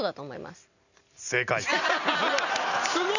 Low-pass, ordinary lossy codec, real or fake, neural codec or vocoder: 7.2 kHz; none; real; none